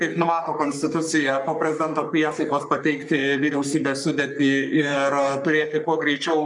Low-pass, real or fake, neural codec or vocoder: 10.8 kHz; fake; codec, 44.1 kHz, 3.4 kbps, Pupu-Codec